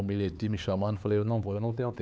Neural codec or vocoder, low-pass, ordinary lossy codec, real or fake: codec, 16 kHz, 4 kbps, X-Codec, HuBERT features, trained on LibriSpeech; none; none; fake